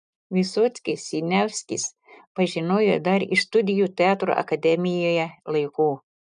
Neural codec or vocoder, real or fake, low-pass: none; real; 9.9 kHz